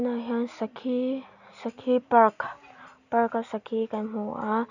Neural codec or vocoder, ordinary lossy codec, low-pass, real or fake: none; none; 7.2 kHz; real